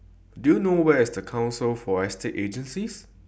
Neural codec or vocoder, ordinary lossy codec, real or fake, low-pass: none; none; real; none